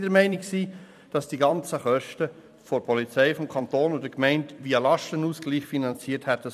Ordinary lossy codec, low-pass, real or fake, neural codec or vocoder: none; 14.4 kHz; fake; vocoder, 44.1 kHz, 128 mel bands every 256 samples, BigVGAN v2